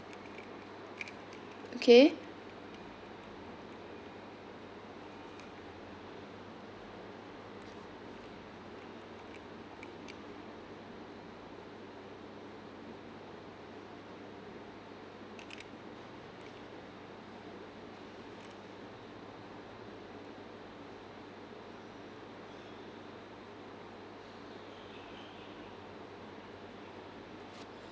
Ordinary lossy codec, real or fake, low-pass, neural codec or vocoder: none; real; none; none